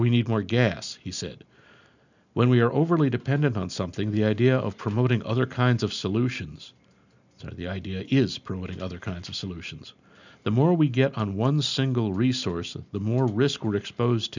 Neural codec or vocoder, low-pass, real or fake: none; 7.2 kHz; real